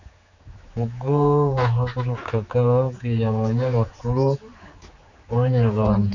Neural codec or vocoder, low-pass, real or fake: codec, 16 kHz, 4 kbps, X-Codec, HuBERT features, trained on general audio; 7.2 kHz; fake